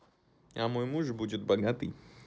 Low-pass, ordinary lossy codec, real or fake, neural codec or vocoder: none; none; real; none